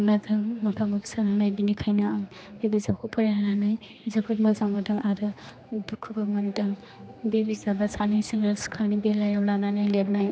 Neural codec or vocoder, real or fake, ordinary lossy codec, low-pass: codec, 16 kHz, 2 kbps, X-Codec, HuBERT features, trained on general audio; fake; none; none